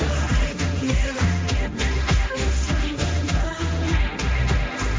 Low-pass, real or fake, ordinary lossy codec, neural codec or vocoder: none; fake; none; codec, 16 kHz, 1.1 kbps, Voila-Tokenizer